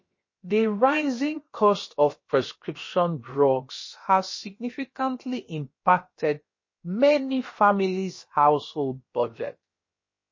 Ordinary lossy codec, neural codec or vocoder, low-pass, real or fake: MP3, 32 kbps; codec, 16 kHz, about 1 kbps, DyCAST, with the encoder's durations; 7.2 kHz; fake